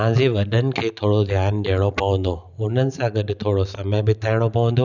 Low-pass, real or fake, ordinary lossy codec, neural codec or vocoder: 7.2 kHz; real; none; none